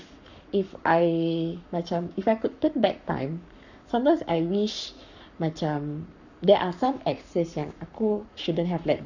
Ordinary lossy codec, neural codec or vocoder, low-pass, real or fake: none; codec, 44.1 kHz, 7.8 kbps, Pupu-Codec; 7.2 kHz; fake